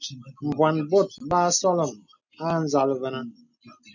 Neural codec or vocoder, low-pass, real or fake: none; 7.2 kHz; real